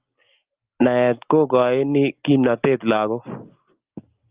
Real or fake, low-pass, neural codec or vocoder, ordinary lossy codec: real; 3.6 kHz; none; Opus, 32 kbps